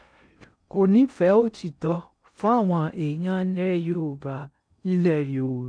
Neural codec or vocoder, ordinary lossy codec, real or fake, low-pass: codec, 16 kHz in and 24 kHz out, 0.6 kbps, FocalCodec, streaming, 4096 codes; none; fake; 9.9 kHz